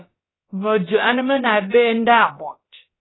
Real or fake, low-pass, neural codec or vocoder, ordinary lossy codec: fake; 7.2 kHz; codec, 16 kHz, about 1 kbps, DyCAST, with the encoder's durations; AAC, 16 kbps